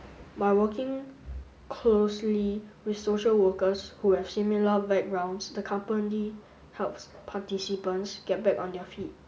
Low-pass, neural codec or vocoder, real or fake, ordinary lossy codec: none; none; real; none